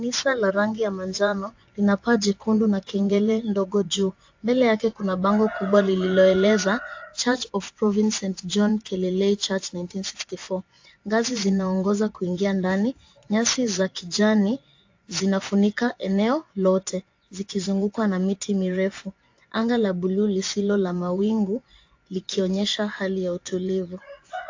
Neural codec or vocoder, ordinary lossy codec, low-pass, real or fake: vocoder, 24 kHz, 100 mel bands, Vocos; AAC, 48 kbps; 7.2 kHz; fake